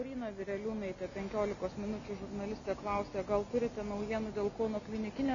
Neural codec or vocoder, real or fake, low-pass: none; real; 7.2 kHz